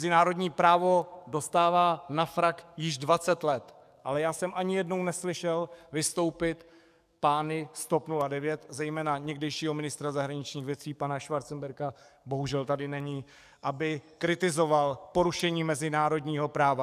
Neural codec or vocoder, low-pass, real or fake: codec, 44.1 kHz, 7.8 kbps, DAC; 14.4 kHz; fake